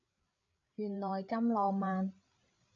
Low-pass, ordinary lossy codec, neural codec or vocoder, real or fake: 7.2 kHz; AAC, 64 kbps; codec, 16 kHz, 8 kbps, FreqCodec, larger model; fake